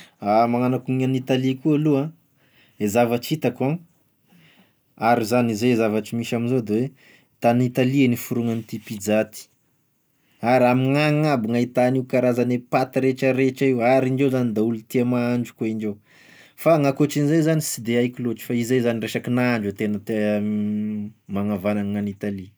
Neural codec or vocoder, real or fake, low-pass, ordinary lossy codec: none; real; none; none